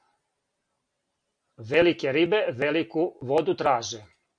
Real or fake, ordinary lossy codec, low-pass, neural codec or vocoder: real; MP3, 96 kbps; 9.9 kHz; none